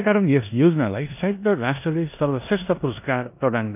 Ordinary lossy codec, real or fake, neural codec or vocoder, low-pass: none; fake; codec, 16 kHz in and 24 kHz out, 0.9 kbps, LongCat-Audio-Codec, four codebook decoder; 3.6 kHz